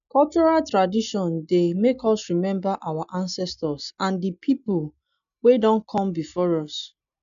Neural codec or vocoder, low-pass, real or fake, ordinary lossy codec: none; 7.2 kHz; real; none